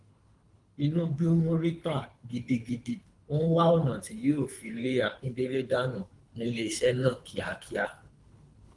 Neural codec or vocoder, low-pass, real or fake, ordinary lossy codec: codec, 24 kHz, 3 kbps, HILCodec; 10.8 kHz; fake; Opus, 32 kbps